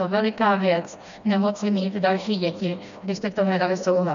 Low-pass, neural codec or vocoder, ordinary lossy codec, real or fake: 7.2 kHz; codec, 16 kHz, 1 kbps, FreqCodec, smaller model; AAC, 96 kbps; fake